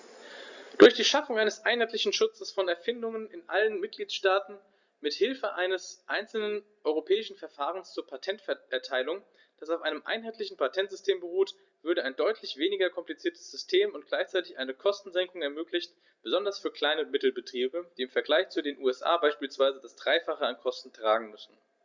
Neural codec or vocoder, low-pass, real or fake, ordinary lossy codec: none; 7.2 kHz; real; Opus, 64 kbps